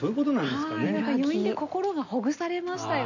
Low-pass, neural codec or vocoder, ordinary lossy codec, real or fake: 7.2 kHz; none; none; real